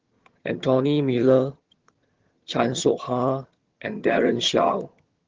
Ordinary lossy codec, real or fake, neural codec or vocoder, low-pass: Opus, 16 kbps; fake; vocoder, 22.05 kHz, 80 mel bands, HiFi-GAN; 7.2 kHz